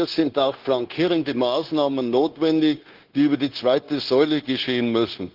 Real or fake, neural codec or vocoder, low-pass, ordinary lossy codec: fake; codec, 16 kHz, 0.9 kbps, LongCat-Audio-Codec; 5.4 kHz; Opus, 16 kbps